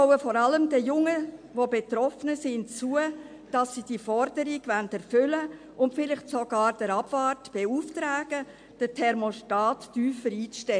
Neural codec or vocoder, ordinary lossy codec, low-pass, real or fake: none; MP3, 64 kbps; 9.9 kHz; real